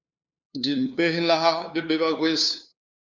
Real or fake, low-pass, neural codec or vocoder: fake; 7.2 kHz; codec, 16 kHz, 2 kbps, FunCodec, trained on LibriTTS, 25 frames a second